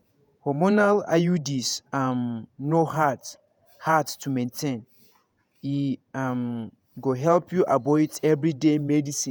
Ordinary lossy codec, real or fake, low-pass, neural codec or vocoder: none; fake; none; vocoder, 48 kHz, 128 mel bands, Vocos